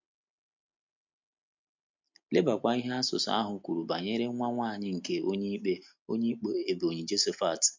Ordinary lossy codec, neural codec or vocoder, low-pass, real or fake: MP3, 48 kbps; none; 7.2 kHz; real